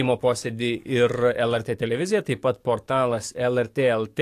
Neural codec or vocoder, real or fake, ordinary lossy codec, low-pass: codec, 44.1 kHz, 7.8 kbps, DAC; fake; AAC, 64 kbps; 14.4 kHz